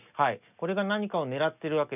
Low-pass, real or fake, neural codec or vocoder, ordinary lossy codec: 3.6 kHz; real; none; none